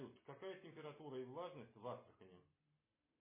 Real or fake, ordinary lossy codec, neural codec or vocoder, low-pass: real; MP3, 16 kbps; none; 3.6 kHz